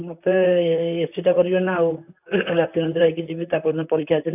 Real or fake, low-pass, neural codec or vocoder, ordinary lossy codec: fake; 3.6 kHz; vocoder, 44.1 kHz, 128 mel bands, Pupu-Vocoder; none